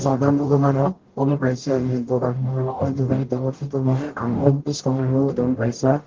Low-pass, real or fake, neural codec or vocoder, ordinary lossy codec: 7.2 kHz; fake; codec, 44.1 kHz, 0.9 kbps, DAC; Opus, 16 kbps